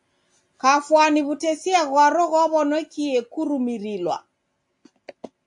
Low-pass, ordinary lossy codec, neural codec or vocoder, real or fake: 10.8 kHz; AAC, 64 kbps; none; real